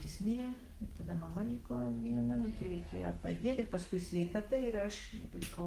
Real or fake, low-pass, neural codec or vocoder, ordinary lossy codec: fake; 14.4 kHz; codec, 32 kHz, 1.9 kbps, SNAC; Opus, 24 kbps